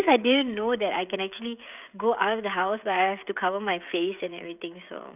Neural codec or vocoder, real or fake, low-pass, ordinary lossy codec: codec, 16 kHz, 16 kbps, FreqCodec, smaller model; fake; 3.6 kHz; none